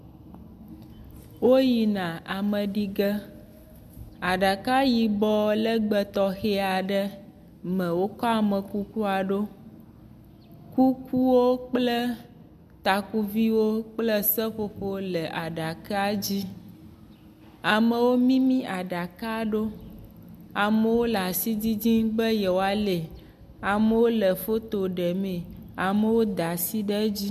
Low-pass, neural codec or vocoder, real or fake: 14.4 kHz; none; real